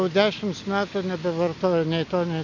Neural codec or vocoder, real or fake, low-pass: none; real; 7.2 kHz